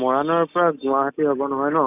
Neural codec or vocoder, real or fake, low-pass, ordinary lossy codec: none; real; 3.6 kHz; none